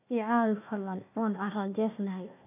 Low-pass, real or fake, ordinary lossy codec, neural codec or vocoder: 3.6 kHz; fake; none; codec, 16 kHz, 1 kbps, FunCodec, trained on Chinese and English, 50 frames a second